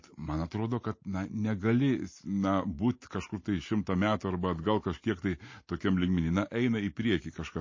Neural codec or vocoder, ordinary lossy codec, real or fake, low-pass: none; MP3, 32 kbps; real; 7.2 kHz